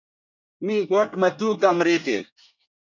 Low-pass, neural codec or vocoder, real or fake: 7.2 kHz; codec, 24 kHz, 1 kbps, SNAC; fake